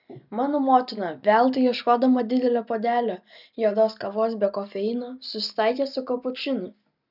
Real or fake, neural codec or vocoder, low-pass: real; none; 5.4 kHz